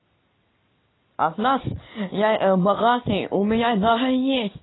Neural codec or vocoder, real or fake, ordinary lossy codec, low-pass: none; real; AAC, 16 kbps; 7.2 kHz